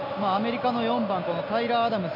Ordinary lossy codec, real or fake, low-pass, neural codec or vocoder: MP3, 32 kbps; real; 5.4 kHz; none